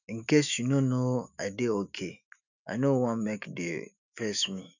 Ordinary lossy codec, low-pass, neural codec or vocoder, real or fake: none; 7.2 kHz; autoencoder, 48 kHz, 128 numbers a frame, DAC-VAE, trained on Japanese speech; fake